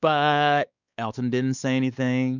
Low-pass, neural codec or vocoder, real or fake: 7.2 kHz; codec, 16 kHz, 4 kbps, X-Codec, WavLM features, trained on Multilingual LibriSpeech; fake